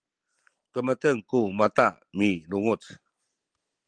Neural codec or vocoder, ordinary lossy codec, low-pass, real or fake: none; Opus, 24 kbps; 9.9 kHz; real